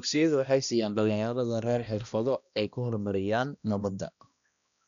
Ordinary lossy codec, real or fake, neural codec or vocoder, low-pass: none; fake; codec, 16 kHz, 1 kbps, X-Codec, HuBERT features, trained on balanced general audio; 7.2 kHz